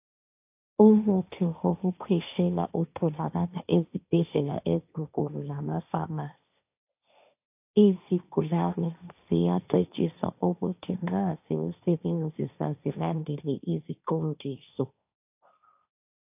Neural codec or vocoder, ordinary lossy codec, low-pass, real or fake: codec, 16 kHz, 1.1 kbps, Voila-Tokenizer; AAC, 32 kbps; 3.6 kHz; fake